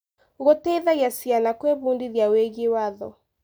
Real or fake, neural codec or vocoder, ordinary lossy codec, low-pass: real; none; none; none